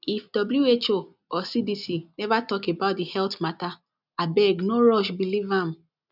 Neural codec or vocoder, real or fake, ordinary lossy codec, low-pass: none; real; none; 5.4 kHz